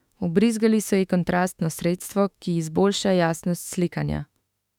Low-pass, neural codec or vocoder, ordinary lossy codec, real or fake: 19.8 kHz; autoencoder, 48 kHz, 32 numbers a frame, DAC-VAE, trained on Japanese speech; none; fake